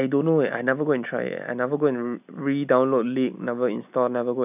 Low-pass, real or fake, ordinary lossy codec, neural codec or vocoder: 3.6 kHz; real; none; none